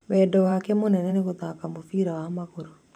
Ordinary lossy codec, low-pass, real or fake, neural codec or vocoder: MP3, 96 kbps; 19.8 kHz; fake; vocoder, 44.1 kHz, 128 mel bands every 512 samples, BigVGAN v2